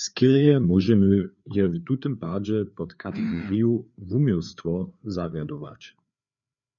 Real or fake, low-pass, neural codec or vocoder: fake; 7.2 kHz; codec, 16 kHz, 4 kbps, FreqCodec, larger model